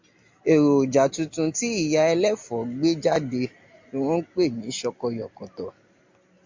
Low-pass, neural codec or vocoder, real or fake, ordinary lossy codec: 7.2 kHz; none; real; MP3, 48 kbps